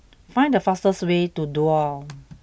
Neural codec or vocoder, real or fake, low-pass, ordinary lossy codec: none; real; none; none